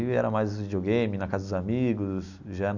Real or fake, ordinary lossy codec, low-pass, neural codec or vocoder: real; none; 7.2 kHz; none